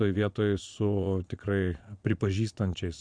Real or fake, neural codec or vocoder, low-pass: fake; vocoder, 22.05 kHz, 80 mel bands, Vocos; 9.9 kHz